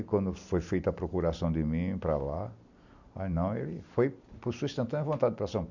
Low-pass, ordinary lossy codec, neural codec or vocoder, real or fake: 7.2 kHz; none; none; real